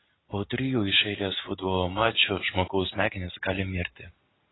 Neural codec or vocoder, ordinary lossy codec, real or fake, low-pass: none; AAC, 16 kbps; real; 7.2 kHz